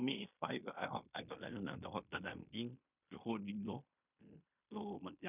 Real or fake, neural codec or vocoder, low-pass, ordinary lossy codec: fake; codec, 16 kHz in and 24 kHz out, 0.9 kbps, LongCat-Audio-Codec, fine tuned four codebook decoder; 3.6 kHz; none